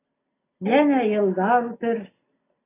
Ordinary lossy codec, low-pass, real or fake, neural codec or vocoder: AAC, 16 kbps; 3.6 kHz; real; none